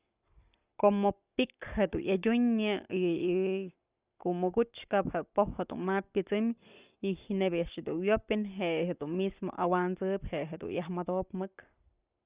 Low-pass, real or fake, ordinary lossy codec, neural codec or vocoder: 3.6 kHz; fake; Opus, 64 kbps; codec, 44.1 kHz, 7.8 kbps, Pupu-Codec